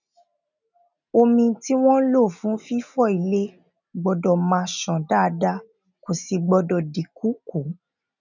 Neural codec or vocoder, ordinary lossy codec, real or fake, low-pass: none; none; real; 7.2 kHz